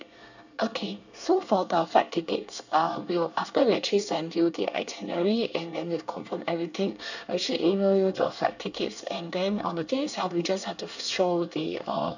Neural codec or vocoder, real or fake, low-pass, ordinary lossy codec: codec, 24 kHz, 1 kbps, SNAC; fake; 7.2 kHz; none